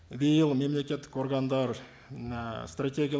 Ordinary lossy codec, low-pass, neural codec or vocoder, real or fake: none; none; none; real